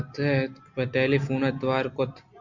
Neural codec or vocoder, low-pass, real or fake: none; 7.2 kHz; real